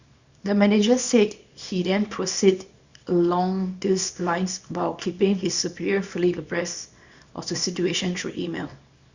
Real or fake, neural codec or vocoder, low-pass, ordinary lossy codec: fake; codec, 24 kHz, 0.9 kbps, WavTokenizer, small release; 7.2 kHz; Opus, 64 kbps